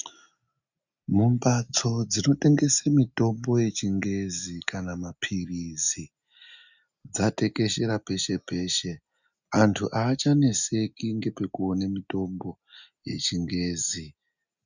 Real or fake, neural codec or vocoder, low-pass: real; none; 7.2 kHz